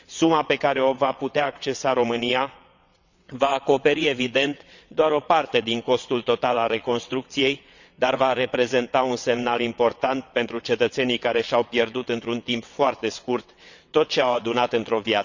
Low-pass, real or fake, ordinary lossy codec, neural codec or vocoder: 7.2 kHz; fake; none; vocoder, 22.05 kHz, 80 mel bands, WaveNeXt